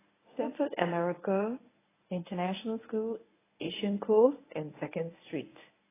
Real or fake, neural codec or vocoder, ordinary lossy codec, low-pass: fake; codec, 24 kHz, 0.9 kbps, WavTokenizer, medium speech release version 1; AAC, 16 kbps; 3.6 kHz